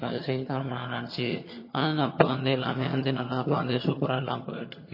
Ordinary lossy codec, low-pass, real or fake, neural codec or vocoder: MP3, 24 kbps; 5.4 kHz; fake; vocoder, 22.05 kHz, 80 mel bands, HiFi-GAN